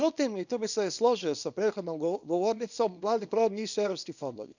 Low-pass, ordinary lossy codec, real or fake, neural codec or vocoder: 7.2 kHz; none; fake; codec, 24 kHz, 0.9 kbps, WavTokenizer, small release